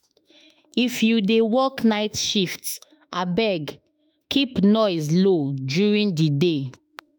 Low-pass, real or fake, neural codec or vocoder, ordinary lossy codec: none; fake; autoencoder, 48 kHz, 32 numbers a frame, DAC-VAE, trained on Japanese speech; none